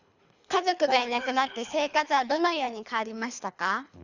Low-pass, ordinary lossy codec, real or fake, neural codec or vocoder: 7.2 kHz; none; fake; codec, 24 kHz, 3 kbps, HILCodec